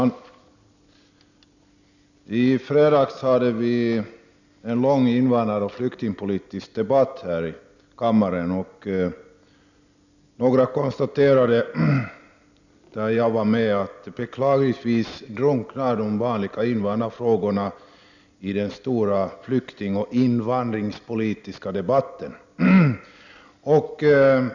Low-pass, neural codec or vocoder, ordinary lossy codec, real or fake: 7.2 kHz; none; none; real